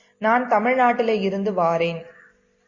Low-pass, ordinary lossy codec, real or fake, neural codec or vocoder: 7.2 kHz; MP3, 32 kbps; real; none